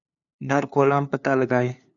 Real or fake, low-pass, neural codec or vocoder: fake; 7.2 kHz; codec, 16 kHz, 2 kbps, FunCodec, trained on LibriTTS, 25 frames a second